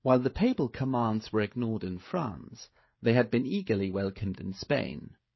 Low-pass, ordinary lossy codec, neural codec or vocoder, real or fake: 7.2 kHz; MP3, 24 kbps; codec, 16 kHz, 16 kbps, FreqCodec, smaller model; fake